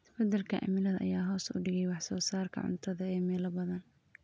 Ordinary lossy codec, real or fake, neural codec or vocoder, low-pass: none; real; none; none